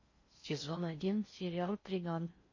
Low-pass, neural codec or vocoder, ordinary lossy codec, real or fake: 7.2 kHz; codec, 16 kHz in and 24 kHz out, 0.6 kbps, FocalCodec, streaming, 4096 codes; MP3, 32 kbps; fake